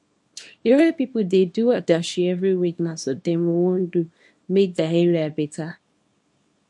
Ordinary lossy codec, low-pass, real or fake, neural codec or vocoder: MP3, 48 kbps; 10.8 kHz; fake; codec, 24 kHz, 0.9 kbps, WavTokenizer, small release